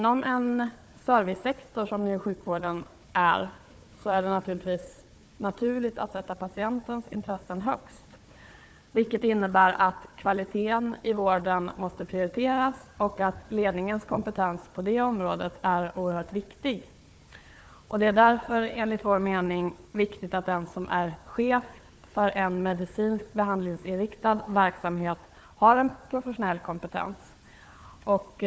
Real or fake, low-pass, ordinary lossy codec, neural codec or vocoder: fake; none; none; codec, 16 kHz, 4 kbps, FunCodec, trained on Chinese and English, 50 frames a second